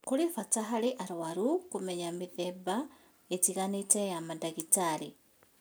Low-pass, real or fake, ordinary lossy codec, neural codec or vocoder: none; real; none; none